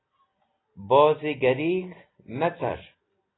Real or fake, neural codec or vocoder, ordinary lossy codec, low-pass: real; none; AAC, 16 kbps; 7.2 kHz